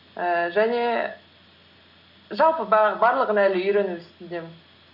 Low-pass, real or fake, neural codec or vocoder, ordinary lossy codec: 5.4 kHz; real; none; none